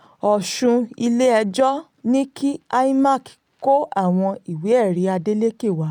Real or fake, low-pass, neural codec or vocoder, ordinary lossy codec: real; 19.8 kHz; none; none